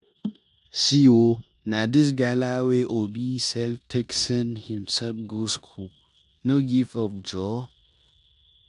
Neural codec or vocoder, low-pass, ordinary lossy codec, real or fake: codec, 16 kHz in and 24 kHz out, 0.9 kbps, LongCat-Audio-Codec, four codebook decoder; 10.8 kHz; none; fake